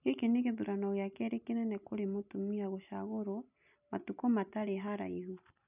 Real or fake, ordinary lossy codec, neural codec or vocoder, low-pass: real; none; none; 3.6 kHz